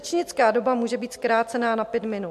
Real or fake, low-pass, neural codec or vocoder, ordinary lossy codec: real; 14.4 kHz; none; MP3, 64 kbps